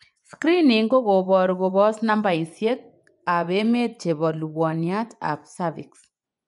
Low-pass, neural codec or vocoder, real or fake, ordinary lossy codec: 10.8 kHz; vocoder, 24 kHz, 100 mel bands, Vocos; fake; none